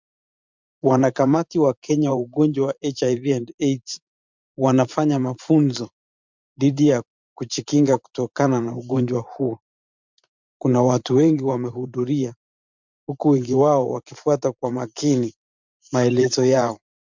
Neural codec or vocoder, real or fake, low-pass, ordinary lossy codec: vocoder, 44.1 kHz, 128 mel bands every 512 samples, BigVGAN v2; fake; 7.2 kHz; MP3, 64 kbps